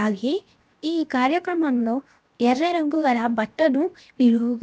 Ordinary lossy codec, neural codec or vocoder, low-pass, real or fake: none; codec, 16 kHz, 0.7 kbps, FocalCodec; none; fake